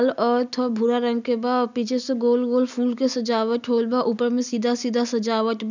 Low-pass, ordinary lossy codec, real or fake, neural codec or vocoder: 7.2 kHz; none; real; none